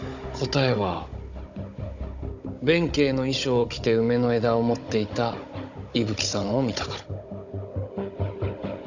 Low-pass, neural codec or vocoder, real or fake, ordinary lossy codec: 7.2 kHz; codec, 16 kHz, 16 kbps, FunCodec, trained on Chinese and English, 50 frames a second; fake; none